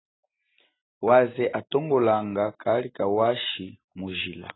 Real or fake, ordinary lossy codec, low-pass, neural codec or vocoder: real; AAC, 16 kbps; 7.2 kHz; none